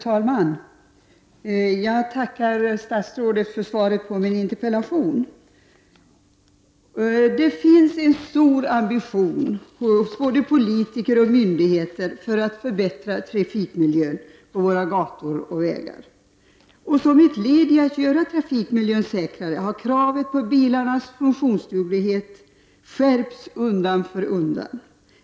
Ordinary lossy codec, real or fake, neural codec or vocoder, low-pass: none; real; none; none